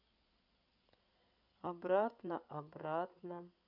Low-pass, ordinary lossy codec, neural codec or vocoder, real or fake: 5.4 kHz; none; codec, 16 kHz in and 24 kHz out, 2.2 kbps, FireRedTTS-2 codec; fake